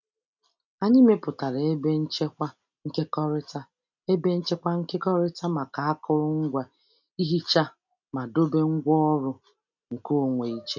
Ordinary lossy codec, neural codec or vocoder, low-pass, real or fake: none; none; 7.2 kHz; real